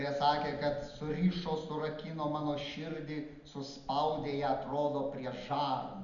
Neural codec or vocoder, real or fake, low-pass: none; real; 7.2 kHz